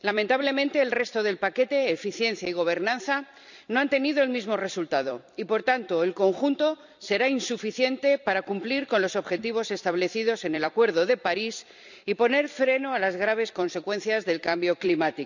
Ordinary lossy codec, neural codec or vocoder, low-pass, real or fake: none; vocoder, 44.1 kHz, 128 mel bands every 256 samples, BigVGAN v2; 7.2 kHz; fake